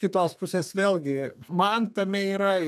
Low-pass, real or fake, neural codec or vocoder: 14.4 kHz; fake; codec, 32 kHz, 1.9 kbps, SNAC